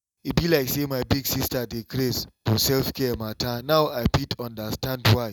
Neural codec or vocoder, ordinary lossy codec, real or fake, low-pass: none; none; real; 19.8 kHz